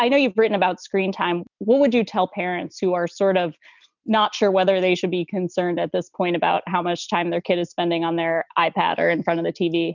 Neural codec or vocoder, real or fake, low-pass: none; real; 7.2 kHz